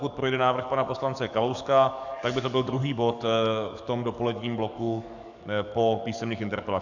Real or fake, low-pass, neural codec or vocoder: fake; 7.2 kHz; codec, 44.1 kHz, 7.8 kbps, DAC